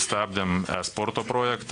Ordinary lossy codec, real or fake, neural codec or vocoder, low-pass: AAC, 48 kbps; real; none; 9.9 kHz